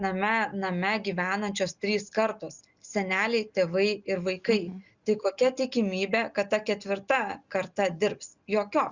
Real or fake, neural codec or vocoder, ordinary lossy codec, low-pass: real; none; Opus, 64 kbps; 7.2 kHz